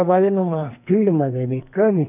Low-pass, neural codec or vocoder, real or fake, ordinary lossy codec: 3.6 kHz; codec, 44.1 kHz, 2.6 kbps, SNAC; fake; none